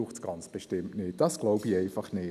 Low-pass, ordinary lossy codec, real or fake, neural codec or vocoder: 14.4 kHz; none; real; none